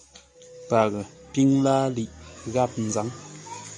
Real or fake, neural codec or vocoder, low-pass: real; none; 10.8 kHz